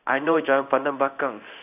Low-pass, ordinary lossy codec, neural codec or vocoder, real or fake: 3.6 kHz; none; codec, 16 kHz in and 24 kHz out, 1 kbps, XY-Tokenizer; fake